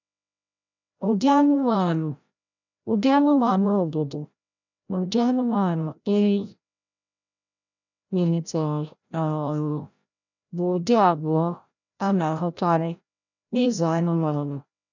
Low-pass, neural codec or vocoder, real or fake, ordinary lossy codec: 7.2 kHz; codec, 16 kHz, 0.5 kbps, FreqCodec, larger model; fake; none